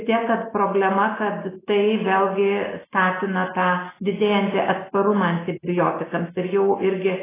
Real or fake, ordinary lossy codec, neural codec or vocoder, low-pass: real; AAC, 16 kbps; none; 3.6 kHz